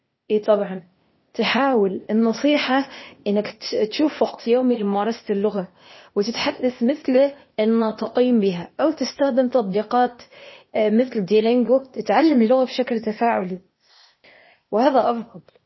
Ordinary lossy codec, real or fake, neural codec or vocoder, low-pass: MP3, 24 kbps; fake; codec, 16 kHz, 0.8 kbps, ZipCodec; 7.2 kHz